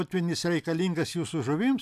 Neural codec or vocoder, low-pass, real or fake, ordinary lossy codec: none; 14.4 kHz; real; AAC, 96 kbps